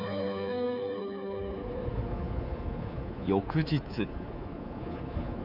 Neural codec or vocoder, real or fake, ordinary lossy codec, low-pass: codec, 24 kHz, 3.1 kbps, DualCodec; fake; none; 5.4 kHz